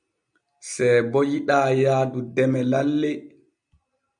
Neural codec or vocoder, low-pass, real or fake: none; 9.9 kHz; real